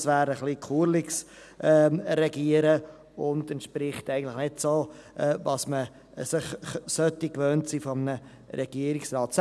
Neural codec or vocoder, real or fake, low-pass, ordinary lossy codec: none; real; none; none